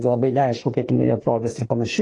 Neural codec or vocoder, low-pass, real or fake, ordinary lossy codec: autoencoder, 48 kHz, 32 numbers a frame, DAC-VAE, trained on Japanese speech; 10.8 kHz; fake; AAC, 32 kbps